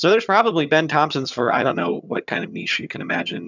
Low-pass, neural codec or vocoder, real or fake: 7.2 kHz; vocoder, 22.05 kHz, 80 mel bands, HiFi-GAN; fake